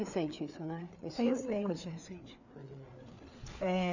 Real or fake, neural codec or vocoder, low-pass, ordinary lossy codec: fake; codec, 16 kHz, 4 kbps, FreqCodec, larger model; 7.2 kHz; none